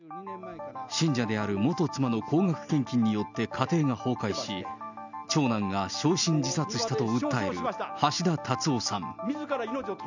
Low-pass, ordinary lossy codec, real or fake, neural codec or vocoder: 7.2 kHz; none; real; none